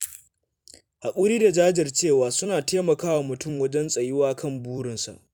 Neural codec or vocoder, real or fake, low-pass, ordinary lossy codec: vocoder, 44.1 kHz, 128 mel bands every 256 samples, BigVGAN v2; fake; 19.8 kHz; none